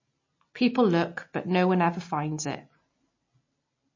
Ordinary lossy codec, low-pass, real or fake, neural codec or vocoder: MP3, 32 kbps; 7.2 kHz; real; none